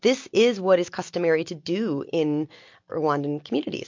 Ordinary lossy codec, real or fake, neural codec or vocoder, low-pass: MP3, 48 kbps; real; none; 7.2 kHz